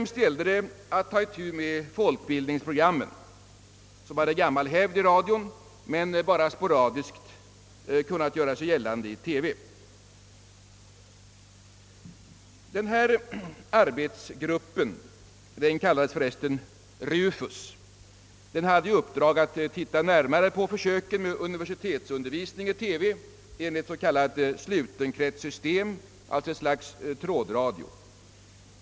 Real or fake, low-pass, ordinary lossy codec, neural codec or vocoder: real; none; none; none